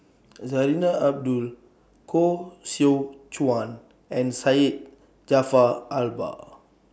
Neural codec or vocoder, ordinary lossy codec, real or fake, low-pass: none; none; real; none